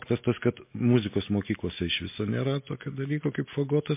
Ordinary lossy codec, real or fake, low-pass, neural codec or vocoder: MP3, 24 kbps; real; 3.6 kHz; none